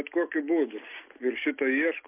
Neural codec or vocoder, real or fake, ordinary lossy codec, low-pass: none; real; MP3, 32 kbps; 3.6 kHz